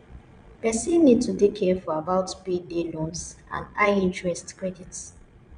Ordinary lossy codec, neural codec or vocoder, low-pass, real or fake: none; vocoder, 22.05 kHz, 80 mel bands, Vocos; 9.9 kHz; fake